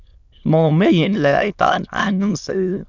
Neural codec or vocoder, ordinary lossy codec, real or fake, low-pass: autoencoder, 22.05 kHz, a latent of 192 numbers a frame, VITS, trained on many speakers; none; fake; 7.2 kHz